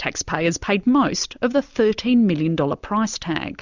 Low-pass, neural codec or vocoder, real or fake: 7.2 kHz; none; real